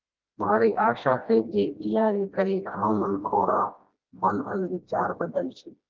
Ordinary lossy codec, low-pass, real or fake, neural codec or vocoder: Opus, 32 kbps; 7.2 kHz; fake; codec, 16 kHz, 1 kbps, FreqCodec, smaller model